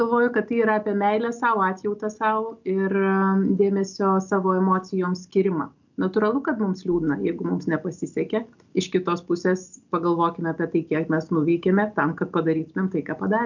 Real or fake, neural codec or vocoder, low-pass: real; none; 7.2 kHz